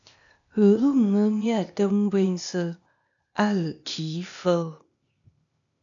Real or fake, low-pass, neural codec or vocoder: fake; 7.2 kHz; codec, 16 kHz, 0.8 kbps, ZipCodec